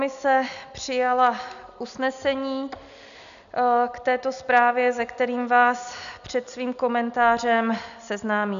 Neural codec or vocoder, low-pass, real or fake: none; 7.2 kHz; real